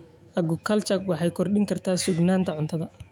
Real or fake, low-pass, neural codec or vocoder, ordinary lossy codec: fake; 19.8 kHz; autoencoder, 48 kHz, 128 numbers a frame, DAC-VAE, trained on Japanese speech; none